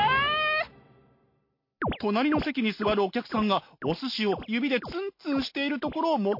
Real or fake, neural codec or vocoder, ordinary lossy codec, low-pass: real; none; MP3, 32 kbps; 5.4 kHz